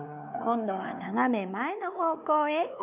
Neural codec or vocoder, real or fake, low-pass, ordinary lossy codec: codec, 16 kHz, 4 kbps, X-Codec, WavLM features, trained on Multilingual LibriSpeech; fake; 3.6 kHz; none